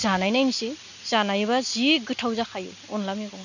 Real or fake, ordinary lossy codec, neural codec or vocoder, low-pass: real; none; none; 7.2 kHz